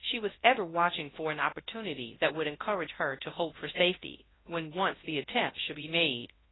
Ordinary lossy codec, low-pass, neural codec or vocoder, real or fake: AAC, 16 kbps; 7.2 kHz; codec, 24 kHz, 0.9 kbps, WavTokenizer, large speech release; fake